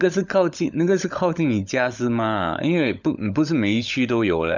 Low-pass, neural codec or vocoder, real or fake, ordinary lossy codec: 7.2 kHz; codec, 16 kHz, 16 kbps, FunCodec, trained on LibriTTS, 50 frames a second; fake; none